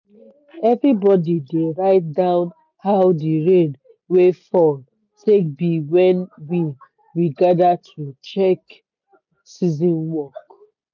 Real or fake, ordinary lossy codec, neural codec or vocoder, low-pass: real; none; none; 7.2 kHz